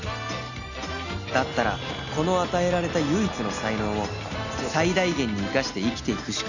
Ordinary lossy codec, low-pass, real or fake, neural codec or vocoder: none; 7.2 kHz; real; none